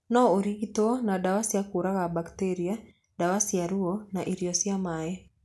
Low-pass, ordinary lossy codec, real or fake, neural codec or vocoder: none; none; real; none